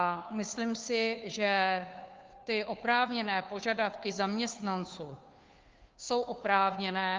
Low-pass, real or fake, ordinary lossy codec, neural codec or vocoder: 7.2 kHz; fake; Opus, 24 kbps; codec, 16 kHz, 2 kbps, FunCodec, trained on Chinese and English, 25 frames a second